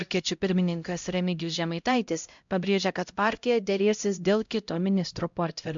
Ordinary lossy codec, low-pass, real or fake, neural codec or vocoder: MP3, 64 kbps; 7.2 kHz; fake; codec, 16 kHz, 0.5 kbps, X-Codec, HuBERT features, trained on LibriSpeech